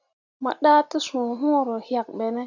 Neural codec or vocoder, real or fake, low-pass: none; real; 7.2 kHz